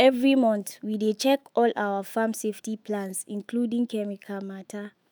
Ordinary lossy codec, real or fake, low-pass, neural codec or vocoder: none; fake; none; autoencoder, 48 kHz, 128 numbers a frame, DAC-VAE, trained on Japanese speech